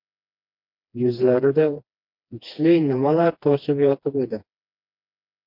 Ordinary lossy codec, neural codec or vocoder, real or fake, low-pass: MP3, 48 kbps; codec, 16 kHz, 2 kbps, FreqCodec, smaller model; fake; 5.4 kHz